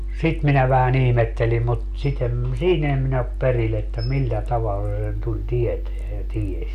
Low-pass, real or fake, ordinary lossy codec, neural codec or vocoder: 14.4 kHz; real; AAC, 96 kbps; none